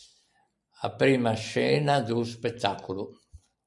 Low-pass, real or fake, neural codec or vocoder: 10.8 kHz; real; none